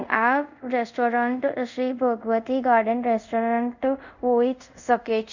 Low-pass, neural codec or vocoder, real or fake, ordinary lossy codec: 7.2 kHz; codec, 24 kHz, 0.5 kbps, DualCodec; fake; none